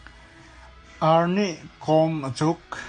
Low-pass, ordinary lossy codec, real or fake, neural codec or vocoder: 9.9 kHz; MP3, 48 kbps; real; none